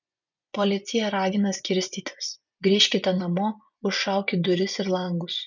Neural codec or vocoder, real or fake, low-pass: vocoder, 24 kHz, 100 mel bands, Vocos; fake; 7.2 kHz